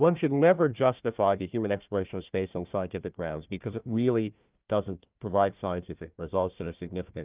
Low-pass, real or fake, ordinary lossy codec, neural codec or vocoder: 3.6 kHz; fake; Opus, 24 kbps; codec, 16 kHz, 1 kbps, FunCodec, trained on Chinese and English, 50 frames a second